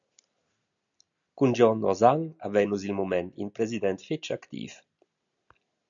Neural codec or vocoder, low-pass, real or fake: none; 7.2 kHz; real